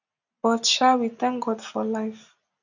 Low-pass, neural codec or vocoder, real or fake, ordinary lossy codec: 7.2 kHz; none; real; none